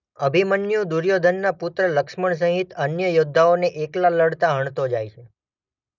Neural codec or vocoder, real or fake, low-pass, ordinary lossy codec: none; real; 7.2 kHz; none